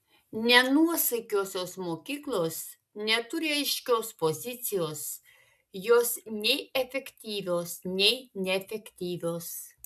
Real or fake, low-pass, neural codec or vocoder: real; 14.4 kHz; none